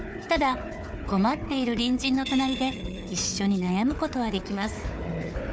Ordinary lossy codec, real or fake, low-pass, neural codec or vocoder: none; fake; none; codec, 16 kHz, 4 kbps, FunCodec, trained on Chinese and English, 50 frames a second